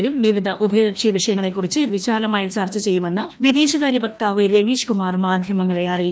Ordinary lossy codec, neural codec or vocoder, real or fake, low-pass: none; codec, 16 kHz, 1 kbps, FreqCodec, larger model; fake; none